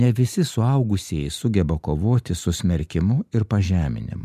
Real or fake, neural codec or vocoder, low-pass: real; none; 14.4 kHz